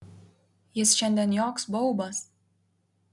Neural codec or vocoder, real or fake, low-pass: none; real; 10.8 kHz